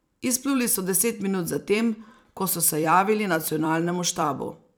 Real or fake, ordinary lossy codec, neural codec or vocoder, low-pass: fake; none; vocoder, 44.1 kHz, 128 mel bands every 256 samples, BigVGAN v2; none